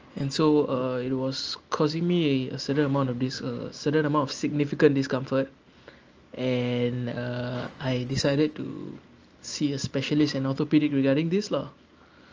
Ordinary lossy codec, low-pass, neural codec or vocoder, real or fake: Opus, 24 kbps; 7.2 kHz; none; real